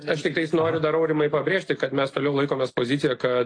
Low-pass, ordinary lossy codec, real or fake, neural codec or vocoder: 9.9 kHz; AAC, 48 kbps; real; none